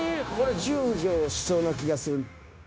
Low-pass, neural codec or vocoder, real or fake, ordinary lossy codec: none; codec, 16 kHz, 0.9 kbps, LongCat-Audio-Codec; fake; none